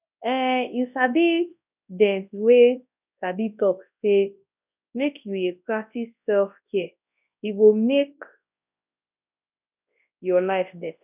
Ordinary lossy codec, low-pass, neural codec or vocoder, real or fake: none; 3.6 kHz; codec, 24 kHz, 0.9 kbps, WavTokenizer, large speech release; fake